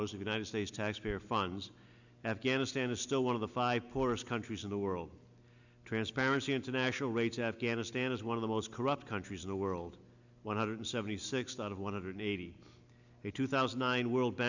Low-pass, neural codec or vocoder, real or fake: 7.2 kHz; none; real